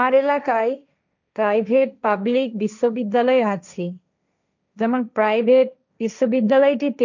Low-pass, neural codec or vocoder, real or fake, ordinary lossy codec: 7.2 kHz; codec, 16 kHz, 1.1 kbps, Voila-Tokenizer; fake; none